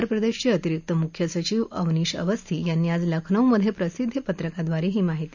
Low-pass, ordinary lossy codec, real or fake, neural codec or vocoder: 7.2 kHz; none; real; none